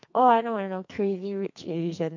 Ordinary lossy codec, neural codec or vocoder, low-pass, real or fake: MP3, 48 kbps; codec, 44.1 kHz, 2.6 kbps, SNAC; 7.2 kHz; fake